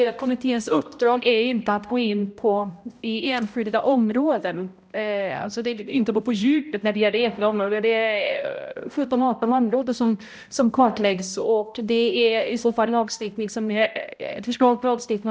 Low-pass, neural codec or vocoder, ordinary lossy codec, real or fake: none; codec, 16 kHz, 0.5 kbps, X-Codec, HuBERT features, trained on balanced general audio; none; fake